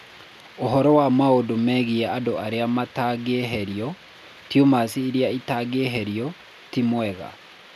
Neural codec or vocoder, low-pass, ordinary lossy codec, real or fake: none; 14.4 kHz; none; real